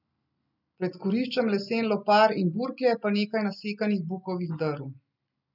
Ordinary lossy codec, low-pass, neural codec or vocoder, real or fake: none; 5.4 kHz; none; real